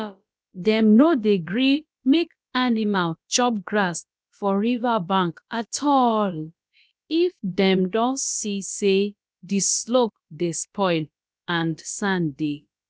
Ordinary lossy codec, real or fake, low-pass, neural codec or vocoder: none; fake; none; codec, 16 kHz, about 1 kbps, DyCAST, with the encoder's durations